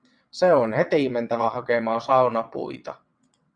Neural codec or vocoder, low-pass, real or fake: vocoder, 22.05 kHz, 80 mel bands, WaveNeXt; 9.9 kHz; fake